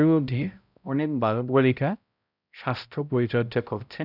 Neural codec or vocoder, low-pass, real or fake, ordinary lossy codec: codec, 16 kHz, 0.5 kbps, X-Codec, HuBERT features, trained on balanced general audio; 5.4 kHz; fake; none